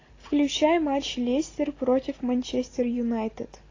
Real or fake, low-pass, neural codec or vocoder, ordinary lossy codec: real; 7.2 kHz; none; AAC, 32 kbps